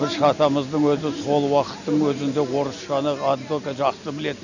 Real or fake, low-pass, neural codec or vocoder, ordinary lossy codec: real; 7.2 kHz; none; none